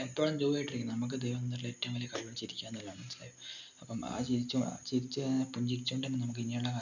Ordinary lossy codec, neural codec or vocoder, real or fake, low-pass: none; none; real; 7.2 kHz